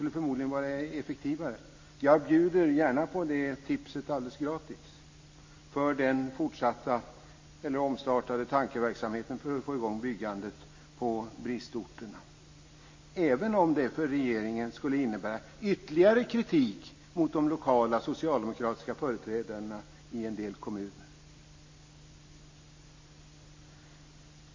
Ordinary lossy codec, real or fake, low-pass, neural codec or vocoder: MP3, 32 kbps; real; 7.2 kHz; none